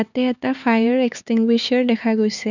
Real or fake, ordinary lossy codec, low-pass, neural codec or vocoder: fake; none; 7.2 kHz; codec, 16 kHz, 4 kbps, X-Codec, HuBERT features, trained on LibriSpeech